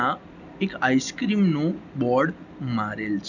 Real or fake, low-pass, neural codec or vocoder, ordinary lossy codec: real; 7.2 kHz; none; none